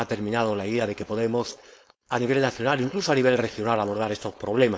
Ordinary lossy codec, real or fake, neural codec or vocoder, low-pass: none; fake; codec, 16 kHz, 4.8 kbps, FACodec; none